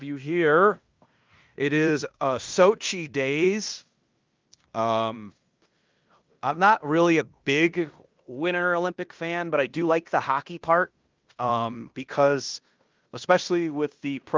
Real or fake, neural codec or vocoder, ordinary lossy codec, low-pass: fake; codec, 16 kHz in and 24 kHz out, 0.9 kbps, LongCat-Audio-Codec, fine tuned four codebook decoder; Opus, 24 kbps; 7.2 kHz